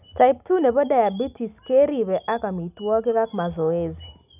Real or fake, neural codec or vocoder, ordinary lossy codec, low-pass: real; none; none; 3.6 kHz